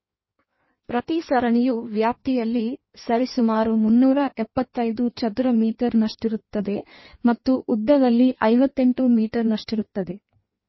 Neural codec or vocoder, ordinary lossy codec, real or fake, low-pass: codec, 16 kHz in and 24 kHz out, 1.1 kbps, FireRedTTS-2 codec; MP3, 24 kbps; fake; 7.2 kHz